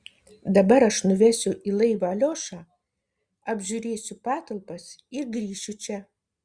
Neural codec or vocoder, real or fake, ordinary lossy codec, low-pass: none; real; Opus, 64 kbps; 9.9 kHz